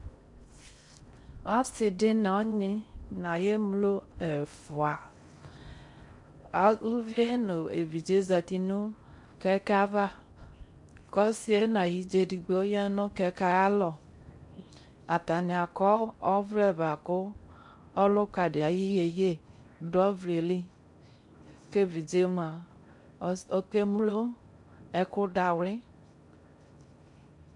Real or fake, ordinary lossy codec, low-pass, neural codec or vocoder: fake; MP3, 64 kbps; 10.8 kHz; codec, 16 kHz in and 24 kHz out, 0.6 kbps, FocalCodec, streaming, 4096 codes